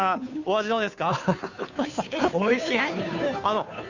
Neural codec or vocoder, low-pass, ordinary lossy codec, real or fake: codec, 16 kHz, 2 kbps, FunCodec, trained on Chinese and English, 25 frames a second; 7.2 kHz; none; fake